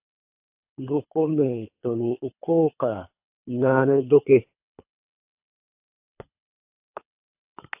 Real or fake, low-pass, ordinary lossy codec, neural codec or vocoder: fake; 3.6 kHz; AAC, 32 kbps; codec, 24 kHz, 3 kbps, HILCodec